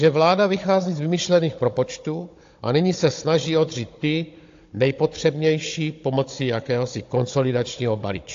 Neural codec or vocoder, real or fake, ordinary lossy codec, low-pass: codec, 16 kHz, 16 kbps, FunCodec, trained on Chinese and English, 50 frames a second; fake; AAC, 48 kbps; 7.2 kHz